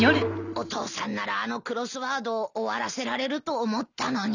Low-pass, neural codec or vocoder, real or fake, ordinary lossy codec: 7.2 kHz; none; real; none